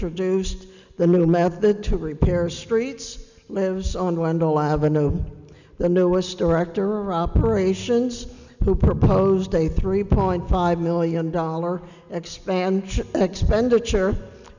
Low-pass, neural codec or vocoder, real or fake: 7.2 kHz; none; real